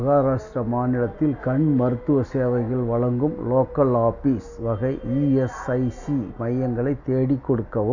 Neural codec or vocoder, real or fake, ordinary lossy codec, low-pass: none; real; none; 7.2 kHz